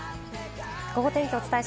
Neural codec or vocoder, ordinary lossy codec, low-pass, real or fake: none; none; none; real